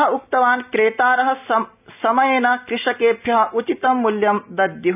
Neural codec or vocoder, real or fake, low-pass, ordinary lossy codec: none; real; 3.6 kHz; none